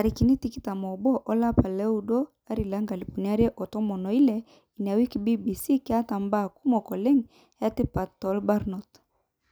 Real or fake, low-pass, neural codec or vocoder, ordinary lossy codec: real; none; none; none